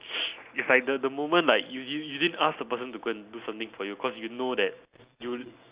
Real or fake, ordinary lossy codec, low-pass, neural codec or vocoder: real; Opus, 64 kbps; 3.6 kHz; none